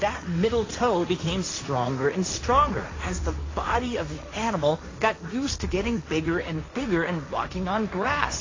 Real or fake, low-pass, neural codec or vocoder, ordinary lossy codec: fake; 7.2 kHz; codec, 16 kHz, 1.1 kbps, Voila-Tokenizer; AAC, 32 kbps